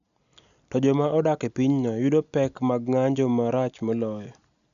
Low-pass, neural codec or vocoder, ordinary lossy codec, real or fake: 7.2 kHz; none; none; real